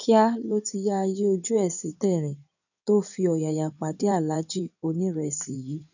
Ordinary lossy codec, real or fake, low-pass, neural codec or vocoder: none; fake; 7.2 kHz; codec, 16 kHz in and 24 kHz out, 2.2 kbps, FireRedTTS-2 codec